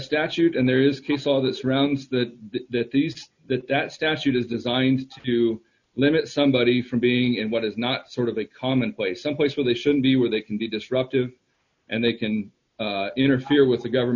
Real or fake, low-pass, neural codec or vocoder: real; 7.2 kHz; none